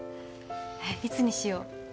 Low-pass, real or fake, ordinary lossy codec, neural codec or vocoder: none; real; none; none